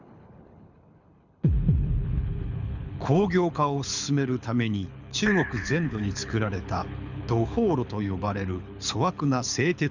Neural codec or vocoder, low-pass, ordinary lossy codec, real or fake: codec, 24 kHz, 6 kbps, HILCodec; 7.2 kHz; none; fake